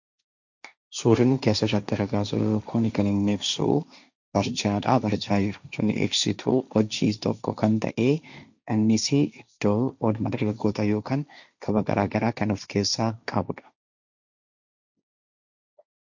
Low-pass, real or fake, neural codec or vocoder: 7.2 kHz; fake; codec, 16 kHz, 1.1 kbps, Voila-Tokenizer